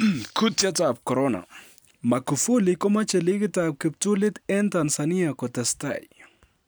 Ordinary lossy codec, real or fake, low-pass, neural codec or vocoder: none; real; none; none